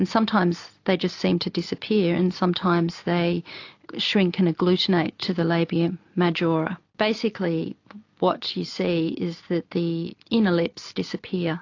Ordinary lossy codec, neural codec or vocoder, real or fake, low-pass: AAC, 48 kbps; none; real; 7.2 kHz